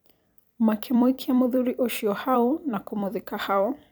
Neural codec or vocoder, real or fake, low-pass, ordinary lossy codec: vocoder, 44.1 kHz, 128 mel bands every 256 samples, BigVGAN v2; fake; none; none